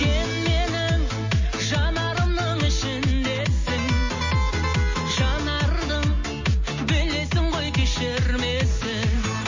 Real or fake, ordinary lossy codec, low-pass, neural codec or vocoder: real; MP3, 32 kbps; 7.2 kHz; none